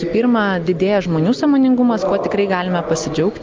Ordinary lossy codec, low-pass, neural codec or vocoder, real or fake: Opus, 24 kbps; 7.2 kHz; none; real